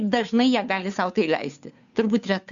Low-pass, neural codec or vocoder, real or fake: 7.2 kHz; codec, 16 kHz, 2 kbps, FunCodec, trained on Chinese and English, 25 frames a second; fake